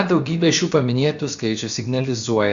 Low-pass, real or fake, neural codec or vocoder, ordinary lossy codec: 7.2 kHz; fake; codec, 16 kHz, about 1 kbps, DyCAST, with the encoder's durations; Opus, 64 kbps